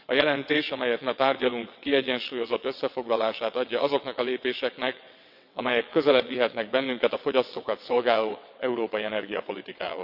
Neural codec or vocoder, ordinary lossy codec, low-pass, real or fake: vocoder, 22.05 kHz, 80 mel bands, WaveNeXt; none; 5.4 kHz; fake